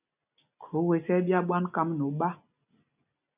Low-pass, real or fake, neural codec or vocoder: 3.6 kHz; real; none